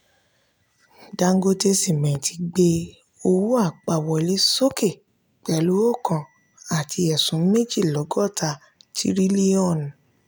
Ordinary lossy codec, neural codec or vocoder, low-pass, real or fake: none; none; none; real